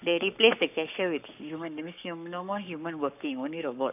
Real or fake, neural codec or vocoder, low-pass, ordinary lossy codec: fake; codec, 44.1 kHz, 7.8 kbps, Pupu-Codec; 3.6 kHz; none